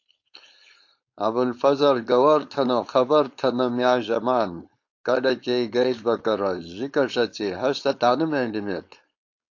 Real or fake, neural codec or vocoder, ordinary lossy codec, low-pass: fake; codec, 16 kHz, 4.8 kbps, FACodec; AAC, 48 kbps; 7.2 kHz